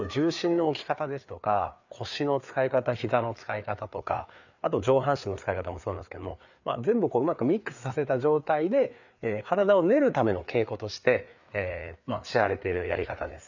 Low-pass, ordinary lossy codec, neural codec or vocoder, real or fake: 7.2 kHz; none; codec, 16 kHz, 4 kbps, FreqCodec, larger model; fake